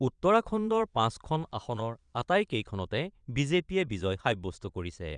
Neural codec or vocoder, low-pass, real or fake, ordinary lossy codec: vocoder, 22.05 kHz, 80 mel bands, Vocos; 9.9 kHz; fake; none